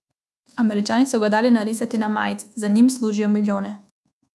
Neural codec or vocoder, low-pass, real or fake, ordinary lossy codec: codec, 24 kHz, 1.2 kbps, DualCodec; none; fake; none